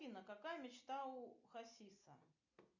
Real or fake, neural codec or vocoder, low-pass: real; none; 7.2 kHz